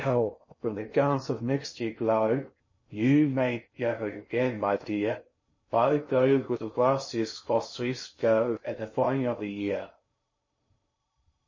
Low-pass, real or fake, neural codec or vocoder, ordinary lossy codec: 7.2 kHz; fake; codec, 16 kHz in and 24 kHz out, 0.6 kbps, FocalCodec, streaming, 2048 codes; MP3, 32 kbps